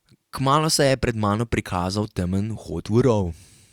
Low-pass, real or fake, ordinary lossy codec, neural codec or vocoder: 19.8 kHz; fake; Opus, 64 kbps; vocoder, 44.1 kHz, 128 mel bands every 256 samples, BigVGAN v2